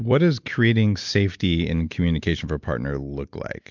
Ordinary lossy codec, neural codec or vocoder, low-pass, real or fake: MP3, 64 kbps; none; 7.2 kHz; real